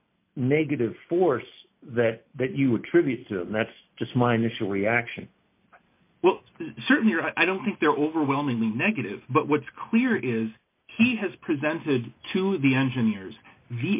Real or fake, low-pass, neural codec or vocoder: real; 3.6 kHz; none